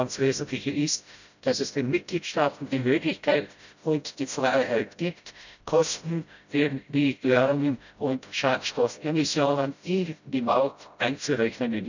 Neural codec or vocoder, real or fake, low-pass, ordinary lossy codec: codec, 16 kHz, 0.5 kbps, FreqCodec, smaller model; fake; 7.2 kHz; none